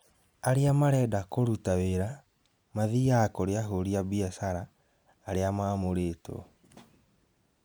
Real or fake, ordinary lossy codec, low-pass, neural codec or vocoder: real; none; none; none